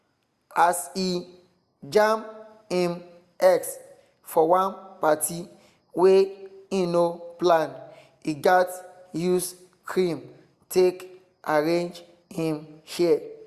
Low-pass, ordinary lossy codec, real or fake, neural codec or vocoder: 14.4 kHz; Opus, 64 kbps; real; none